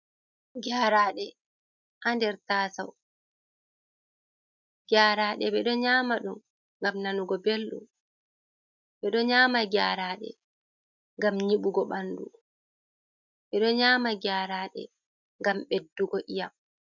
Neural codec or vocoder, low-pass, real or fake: none; 7.2 kHz; real